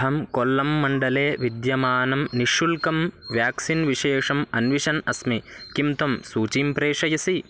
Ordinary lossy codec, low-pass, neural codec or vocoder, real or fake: none; none; none; real